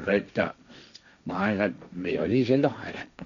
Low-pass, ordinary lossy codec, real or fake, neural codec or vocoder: 7.2 kHz; none; fake; codec, 16 kHz, 1.1 kbps, Voila-Tokenizer